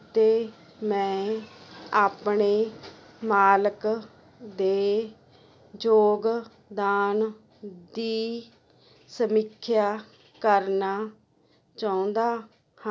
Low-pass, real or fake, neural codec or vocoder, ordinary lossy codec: none; real; none; none